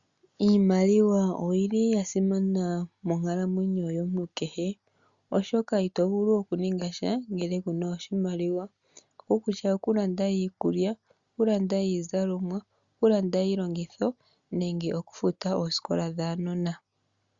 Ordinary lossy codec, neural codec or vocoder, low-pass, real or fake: Opus, 64 kbps; none; 7.2 kHz; real